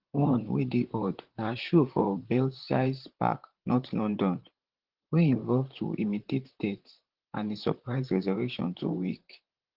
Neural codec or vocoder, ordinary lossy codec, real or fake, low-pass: vocoder, 44.1 kHz, 128 mel bands, Pupu-Vocoder; Opus, 16 kbps; fake; 5.4 kHz